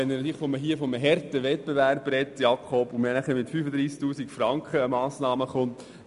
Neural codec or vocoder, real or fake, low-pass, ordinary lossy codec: none; real; 10.8 kHz; none